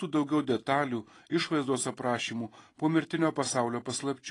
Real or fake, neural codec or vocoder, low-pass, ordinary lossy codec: real; none; 10.8 kHz; AAC, 32 kbps